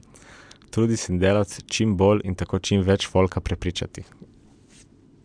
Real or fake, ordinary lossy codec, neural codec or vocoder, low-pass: real; MP3, 96 kbps; none; 9.9 kHz